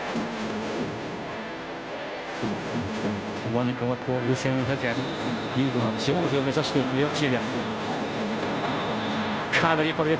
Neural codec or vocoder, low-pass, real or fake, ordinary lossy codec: codec, 16 kHz, 0.5 kbps, FunCodec, trained on Chinese and English, 25 frames a second; none; fake; none